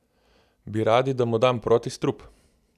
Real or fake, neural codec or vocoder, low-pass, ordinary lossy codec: real; none; 14.4 kHz; none